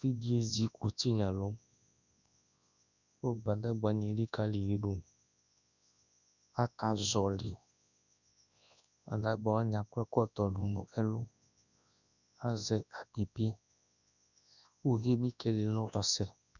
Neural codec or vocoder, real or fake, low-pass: codec, 24 kHz, 0.9 kbps, WavTokenizer, large speech release; fake; 7.2 kHz